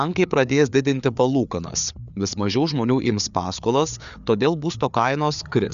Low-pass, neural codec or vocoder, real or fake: 7.2 kHz; codec, 16 kHz, 4 kbps, FreqCodec, larger model; fake